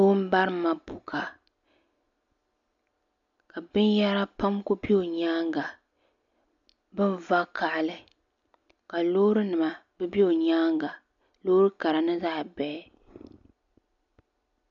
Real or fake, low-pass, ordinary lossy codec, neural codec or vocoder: real; 7.2 kHz; MP3, 64 kbps; none